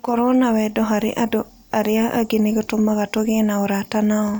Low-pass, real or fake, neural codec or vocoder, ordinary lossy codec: none; real; none; none